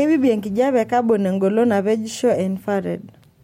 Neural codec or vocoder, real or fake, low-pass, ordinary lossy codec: none; real; 19.8 kHz; MP3, 64 kbps